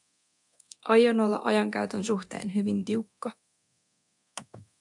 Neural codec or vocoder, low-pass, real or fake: codec, 24 kHz, 0.9 kbps, DualCodec; 10.8 kHz; fake